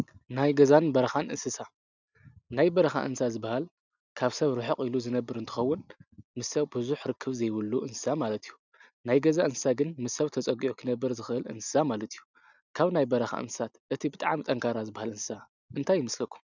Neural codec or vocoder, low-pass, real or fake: none; 7.2 kHz; real